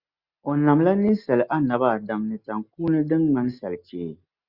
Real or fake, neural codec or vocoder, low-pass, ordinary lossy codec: real; none; 5.4 kHz; Opus, 64 kbps